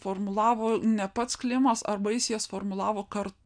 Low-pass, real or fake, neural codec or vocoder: 9.9 kHz; real; none